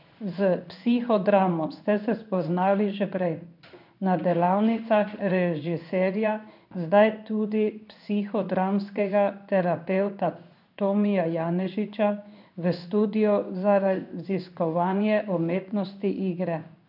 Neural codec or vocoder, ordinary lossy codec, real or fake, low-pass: codec, 16 kHz in and 24 kHz out, 1 kbps, XY-Tokenizer; none; fake; 5.4 kHz